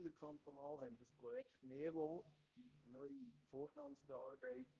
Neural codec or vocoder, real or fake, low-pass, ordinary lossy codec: codec, 16 kHz, 0.5 kbps, X-Codec, HuBERT features, trained on balanced general audio; fake; 7.2 kHz; Opus, 16 kbps